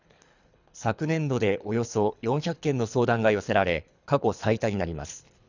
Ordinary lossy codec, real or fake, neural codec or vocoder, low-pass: none; fake; codec, 24 kHz, 3 kbps, HILCodec; 7.2 kHz